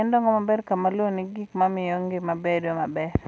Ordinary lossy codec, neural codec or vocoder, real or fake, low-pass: none; none; real; none